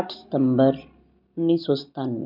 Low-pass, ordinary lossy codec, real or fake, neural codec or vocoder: 5.4 kHz; none; real; none